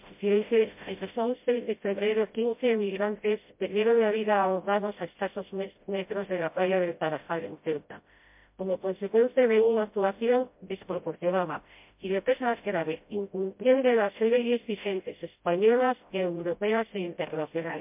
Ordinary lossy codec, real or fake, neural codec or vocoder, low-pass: MP3, 32 kbps; fake; codec, 16 kHz, 0.5 kbps, FreqCodec, smaller model; 3.6 kHz